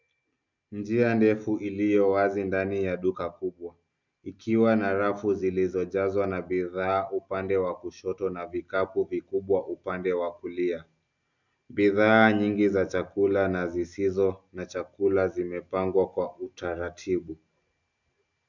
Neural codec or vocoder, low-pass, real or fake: none; 7.2 kHz; real